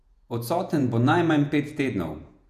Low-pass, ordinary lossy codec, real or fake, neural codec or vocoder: 14.4 kHz; none; fake; vocoder, 48 kHz, 128 mel bands, Vocos